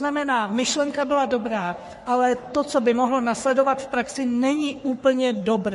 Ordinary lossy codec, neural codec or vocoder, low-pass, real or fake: MP3, 48 kbps; codec, 44.1 kHz, 3.4 kbps, Pupu-Codec; 14.4 kHz; fake